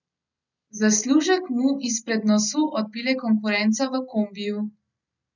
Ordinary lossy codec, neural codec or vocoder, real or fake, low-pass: none; none; real; 7.2 kHz